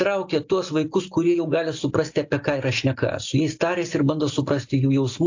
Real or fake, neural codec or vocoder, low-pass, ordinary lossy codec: real; none; 7.2 kHz; AAC, 48 kbps